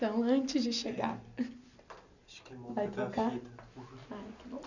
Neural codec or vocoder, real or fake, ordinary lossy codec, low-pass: none; real; none; 7.2 kHz